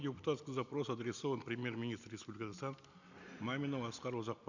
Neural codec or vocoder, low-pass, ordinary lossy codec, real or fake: none; 7.2 kHz; none; real